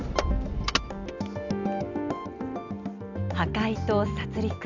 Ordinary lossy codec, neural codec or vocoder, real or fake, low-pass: none; none; real; 7.2 kHz